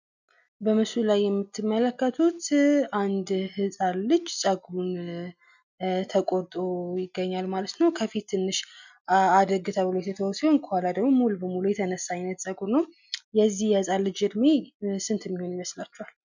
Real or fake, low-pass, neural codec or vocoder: real; 7.2 kHz; none